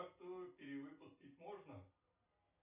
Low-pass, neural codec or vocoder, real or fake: 3.6 kHz; none; real